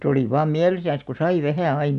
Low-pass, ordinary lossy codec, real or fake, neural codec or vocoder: 10.8 kHz; none; real; none